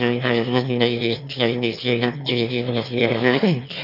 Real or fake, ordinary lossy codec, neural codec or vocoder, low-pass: fake; none; autoencoder, 22.05 kHz, a latent of 192 numbers a frame, VITS, trained on one speaker; 5.4 kHz